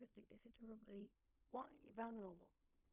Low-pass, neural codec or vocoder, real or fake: 3.6 kHz; codec, 16 kHz in and 24 kHz out, 0.4 kbps, LongCat-Audio-Codec, fine tuned four codebook decoder; fake